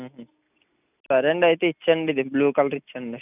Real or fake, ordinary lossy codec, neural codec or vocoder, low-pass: real; none; none; 3.6 kHz